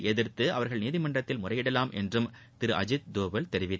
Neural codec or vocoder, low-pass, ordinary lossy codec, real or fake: none; none; none; real